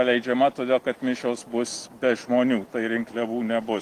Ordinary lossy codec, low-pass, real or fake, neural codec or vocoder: Opus, 24 kbps; 14.4 kHz; real; none